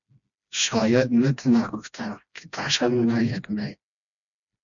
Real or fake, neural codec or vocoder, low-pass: fake; codec, 16 kHz, 1 kbps, FreqCodec, smaller model; 7.2 kHz